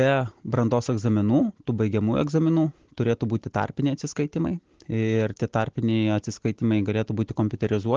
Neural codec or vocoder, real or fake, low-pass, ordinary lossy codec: none; real; 7.2 kHz; Opus, 16 kbps